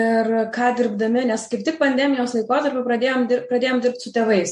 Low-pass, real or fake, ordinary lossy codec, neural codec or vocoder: 14.4 kHz; real; MP3, 48 kbps; none